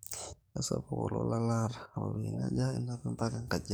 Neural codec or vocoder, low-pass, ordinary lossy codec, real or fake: codec, 44.1 kHz, 7.8 kbps, DAC; none; none; fake